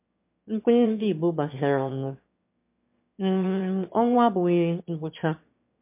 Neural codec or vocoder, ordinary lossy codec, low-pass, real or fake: autoencoder, 22.05 kHz, a latent of 192 numbers a frame, VITS, trained on one speaker; MP3, 24 kbps; 3.6 kHz; fake